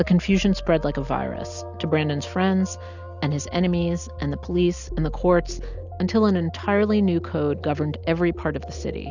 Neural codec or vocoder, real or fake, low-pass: none; real; 7.2 kHz